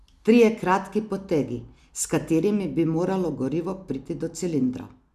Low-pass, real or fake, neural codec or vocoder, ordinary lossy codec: 14.4 kHz; real; none; none